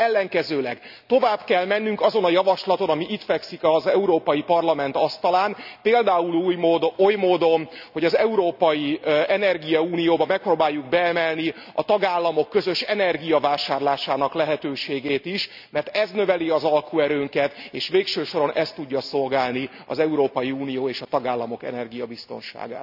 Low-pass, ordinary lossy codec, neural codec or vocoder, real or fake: 5.4 kHz; none; none; real